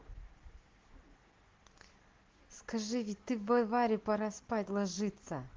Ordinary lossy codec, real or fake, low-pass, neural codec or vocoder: Opus, 16 kbps; real; 7.2 kHz; none